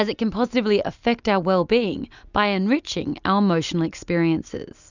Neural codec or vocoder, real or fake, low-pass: none; real; 7.2 kHz